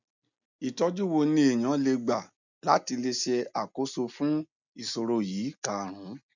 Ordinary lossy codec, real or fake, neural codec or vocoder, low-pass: none; real; none; 7.2 kHz